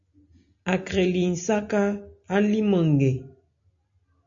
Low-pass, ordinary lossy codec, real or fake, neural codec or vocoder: 7.2 kHz; AAC, 64 kbps; real; none